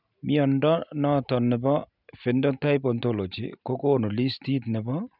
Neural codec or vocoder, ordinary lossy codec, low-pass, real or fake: none; none; 5.4 kHz; real